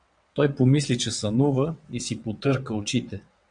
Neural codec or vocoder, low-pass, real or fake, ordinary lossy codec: vocoder, 22.05 kHz, 80 mel bands, WaveNeXt; 9.9 kHz; fake; MP3, 64 kbps